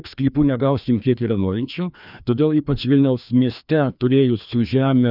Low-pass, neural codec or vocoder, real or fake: 5.4 kHz; codec, 16 kHz, 2 kbps, FreqCodec, larger model; fake